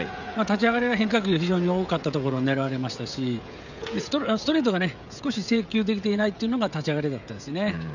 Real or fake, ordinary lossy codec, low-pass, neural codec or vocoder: fake; none; 7.2 kHz; codec, 16 kHz, 16 kbps, FreqCodec, smaller model